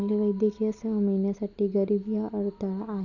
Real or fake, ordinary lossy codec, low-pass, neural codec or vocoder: real; none; 7.2 kHz; none